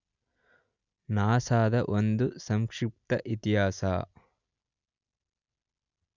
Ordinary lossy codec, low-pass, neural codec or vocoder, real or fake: none; 7.2 kHz; none; real